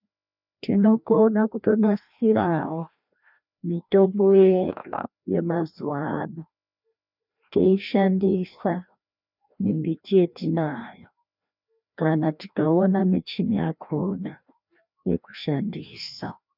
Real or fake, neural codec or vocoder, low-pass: fake; codec, 16 kHz, 1 kbps, FreqCodec, larger model; 5.4 kHz